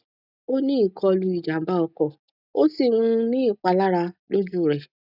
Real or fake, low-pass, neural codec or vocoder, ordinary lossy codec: real; 5.4 kHz; none; none